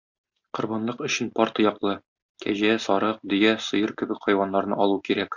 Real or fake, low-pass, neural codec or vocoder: real; 7.2 kHz; none